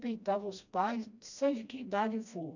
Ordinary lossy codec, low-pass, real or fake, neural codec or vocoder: none; 7.2 kHz; fake; codec, 16 kHz, 1 kbps, FreqCodec, smaller model